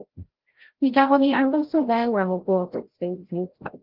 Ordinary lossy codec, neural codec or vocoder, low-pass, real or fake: Opus, 16 kbps; codec, 16 kHz, 0.5 kbps, FreqCodec, larger model; 5.4 kHz; fake